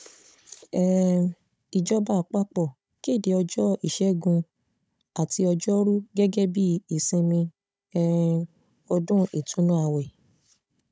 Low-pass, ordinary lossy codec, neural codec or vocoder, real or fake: none; none; codec, 16 kHz, 16 kbps, FunCodec, trained on Chinese and English, 50 frames a second; fake